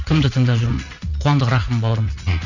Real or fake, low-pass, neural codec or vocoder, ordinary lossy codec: fake; 7.2 kHz; vocoder, 44.1 kHz, 80 mel bands, Vocos; none